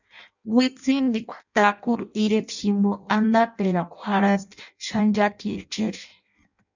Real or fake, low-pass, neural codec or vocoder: fake; 7.2 kHz; codec, 16 kHz in and 24 kHz out, 0.6 kbps, FireRedTTS-2 codec